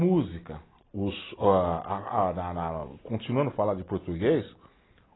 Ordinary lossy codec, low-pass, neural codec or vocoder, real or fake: AAC, 16 kbps; 7.2 kHz; none; real